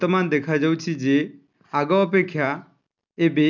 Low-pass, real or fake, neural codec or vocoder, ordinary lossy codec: 7.2 kHz; real; none; none